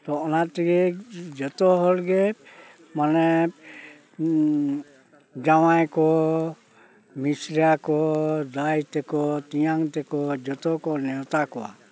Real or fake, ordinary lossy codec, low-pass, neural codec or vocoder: real; none; none; none